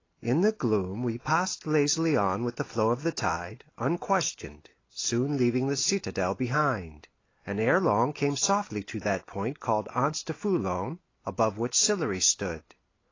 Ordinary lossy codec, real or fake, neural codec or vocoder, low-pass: AAC, 32 kbps; real; none; 7.2 kHz